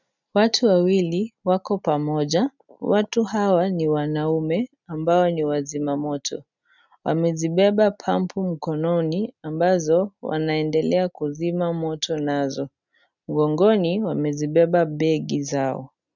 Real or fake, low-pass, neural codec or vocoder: real; 7.2 kHz; none